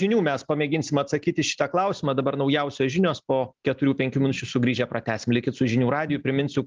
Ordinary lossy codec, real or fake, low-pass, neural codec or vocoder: Opus, 24 kbps; real; 7.2 kHz; none